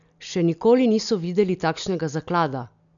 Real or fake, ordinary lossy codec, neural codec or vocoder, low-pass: real; none; none; 7.2 kHz